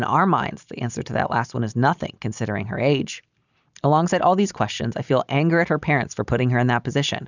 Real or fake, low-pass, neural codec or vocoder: fake; 7.2 kHz; vocoder, 44.1 kHz, 128 mel bands every 256 samples, BigVGAN v2